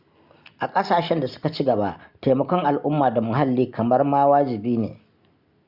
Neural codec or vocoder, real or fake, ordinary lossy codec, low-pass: none; real; Opus, 64 kbps; 5.4 kHz